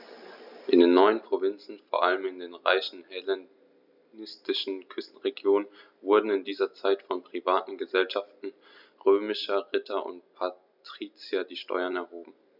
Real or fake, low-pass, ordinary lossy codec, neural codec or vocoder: real; 5.4 kHz; none; none